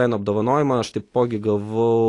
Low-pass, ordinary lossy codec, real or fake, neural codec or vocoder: 10.8 kHz; AAC, 48 kbps; real; none